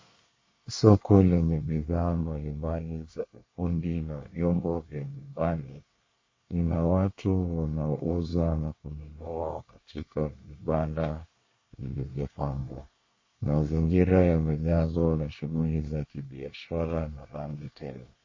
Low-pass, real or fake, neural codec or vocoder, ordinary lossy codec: 7.2 kHz; fake; codec, 24 kHz, 1 kbps, SNAC; MP3, 32 kbps